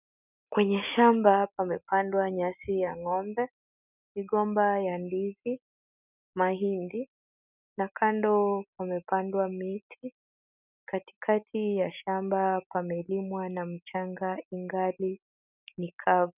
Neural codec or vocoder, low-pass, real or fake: none; 3.6 kHz; real